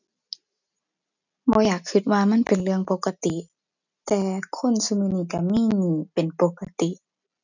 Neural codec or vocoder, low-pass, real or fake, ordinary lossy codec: none; 7.2 kHz; real; none